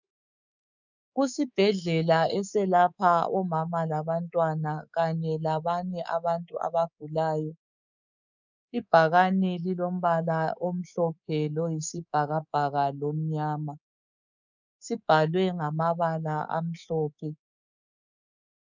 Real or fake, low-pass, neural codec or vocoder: fake; 7.2 kHz; codec, 24 kHz, 3.1 kbps, DualCodec